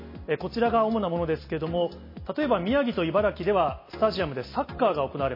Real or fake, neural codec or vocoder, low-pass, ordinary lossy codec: real; none; 5.4 kHz; MP3, 24 kbps